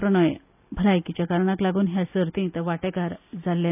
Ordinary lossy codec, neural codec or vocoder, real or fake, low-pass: none; none; real; 3.6 kHz